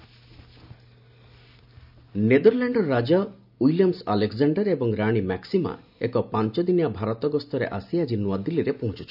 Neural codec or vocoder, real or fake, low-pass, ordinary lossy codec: none; real; 5.4 kHz; none